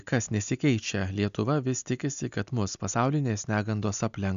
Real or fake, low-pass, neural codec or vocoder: real; 7.2 kHz; none